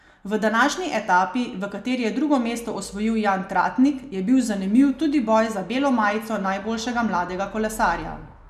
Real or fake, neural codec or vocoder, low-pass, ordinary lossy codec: real; none; 14.4 kHz; none